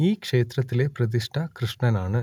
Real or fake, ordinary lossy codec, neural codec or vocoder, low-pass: real; none; none; 19.8 kHz